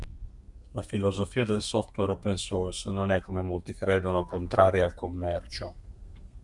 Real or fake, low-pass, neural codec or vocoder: fake; 10.8 kHz; codec, 32 kHz, 1.9 kbps, SNAC